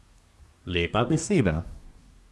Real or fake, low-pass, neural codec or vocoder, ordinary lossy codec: fake; none; codec, 24 kHz, 1 kbps, SNAC; none